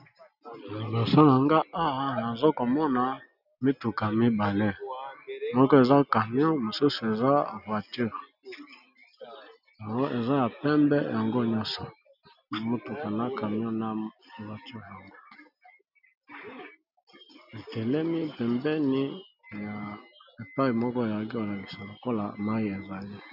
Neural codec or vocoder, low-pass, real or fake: none; 5.4 kHz; real